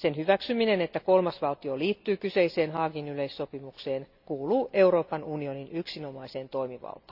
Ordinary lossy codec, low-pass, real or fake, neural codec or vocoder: none; 5.4 kHz; real; none